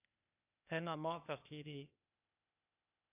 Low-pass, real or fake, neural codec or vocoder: 3.6 kHz; fake; codec, 16 kHz, 0.8 kbps, ZipCodec